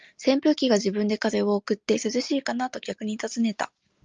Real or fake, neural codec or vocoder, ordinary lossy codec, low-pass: real; none; Opus, 24 kbps; 7.2 kHz